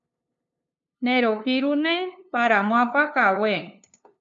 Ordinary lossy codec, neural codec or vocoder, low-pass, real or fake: MP3, 64 kbps; codec, 16 kHz, 2 kbps, FunCodec, trained on LibriTTS, 25 frames a second; 7.2 kHz; fake